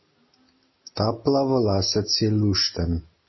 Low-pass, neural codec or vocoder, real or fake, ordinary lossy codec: 7.2 kHz; none; real; MP3, 24 kbps